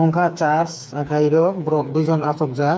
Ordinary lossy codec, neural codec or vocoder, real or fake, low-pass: none; codec, 16 kHz, 4 kbps, FreqCodec, smaller model; fake; none